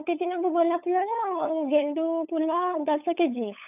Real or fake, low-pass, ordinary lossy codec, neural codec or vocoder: fake; 3.6 kHz; none; codec, 16 kHz, 8 kbps, FunCodec, trained on LibriTTS, 25 frames a second